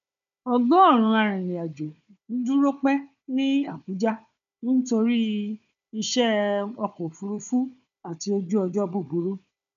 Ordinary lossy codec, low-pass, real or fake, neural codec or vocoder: none; 7.2 kHz; fake; codec, 16 kHz, 4 kbps, FunCodec, trained on Chinese and English, 50 frames a second